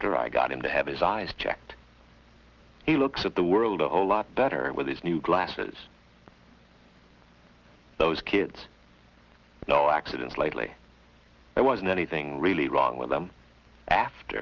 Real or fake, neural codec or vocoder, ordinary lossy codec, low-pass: real; none; Opus, 16 kbps; 7.2 kHz